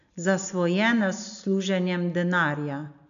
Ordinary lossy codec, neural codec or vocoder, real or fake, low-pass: none; none; real; 7.2 kHz